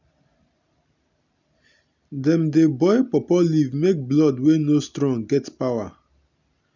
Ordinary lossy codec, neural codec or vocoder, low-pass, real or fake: none; none; 7.2 kHz; real